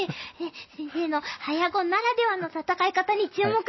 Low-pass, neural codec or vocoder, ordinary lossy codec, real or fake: 7.2 kHz; none; MP3, 24 kbps; real